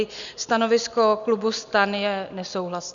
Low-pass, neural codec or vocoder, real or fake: 7.2 kHz; none; real